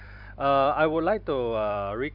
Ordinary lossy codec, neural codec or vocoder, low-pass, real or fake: none; vocoder, 44.1 kHz, 128 mel bands every 256 samples, BigVGAN v2; 5.4 kHz; fake